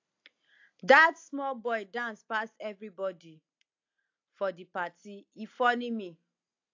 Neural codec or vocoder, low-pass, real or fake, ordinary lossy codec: none; 7.2 kHz; real; AAC, 48 kbps